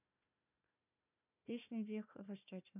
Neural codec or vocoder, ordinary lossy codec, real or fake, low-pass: codec, 16 kHz, 1 kbps, FunCodec, trained on Chinese and English, 50 frames a second; none; fake; 3.6 kHz